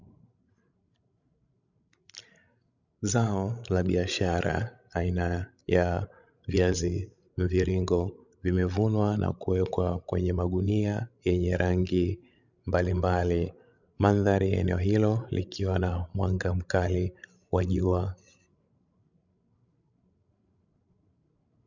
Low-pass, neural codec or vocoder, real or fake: 7.2 kHz; codec, 16 kHz, 16 kbps, FreqCodec, larger model; fake